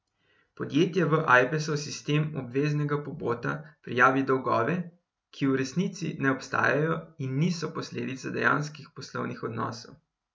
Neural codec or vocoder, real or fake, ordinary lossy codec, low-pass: none; real; none; none